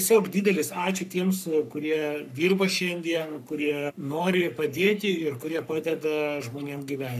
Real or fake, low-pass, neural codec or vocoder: fake; 14.4 kHz; codec, 44.1 kHz, 3.4 kbps, Pupu-Codec